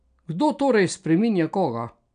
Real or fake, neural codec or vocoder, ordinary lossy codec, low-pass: real; none; MP3, 96 kbps; 9.9 kHz